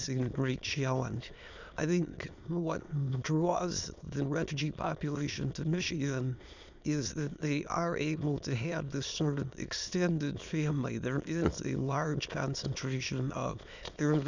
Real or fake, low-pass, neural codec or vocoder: fake; 7.2 kHz; autoencoder, 22.05 kHz, a latent of 192 numbers a frame, VITS, trained on many speakers